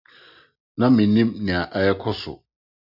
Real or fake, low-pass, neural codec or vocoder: real; 5.4 kHz; none